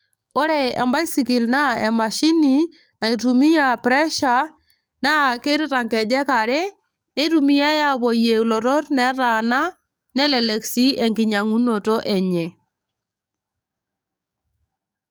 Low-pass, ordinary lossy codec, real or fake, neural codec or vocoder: none; none; fake; codec, 44.1 kHz, 7.8 kbps, DAC